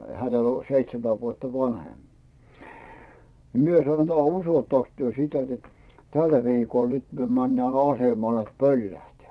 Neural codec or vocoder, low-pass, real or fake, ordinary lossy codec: vocoder, 22.05 kHz, 80 mel bands, Vocos; none; fake; none